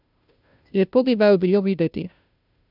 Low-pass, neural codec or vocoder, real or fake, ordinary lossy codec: 5.4 kHz; codec, 16 kHz, 0.5 kbps, FunCodec, trained on Chinese and English, 25 frames a second; fake; none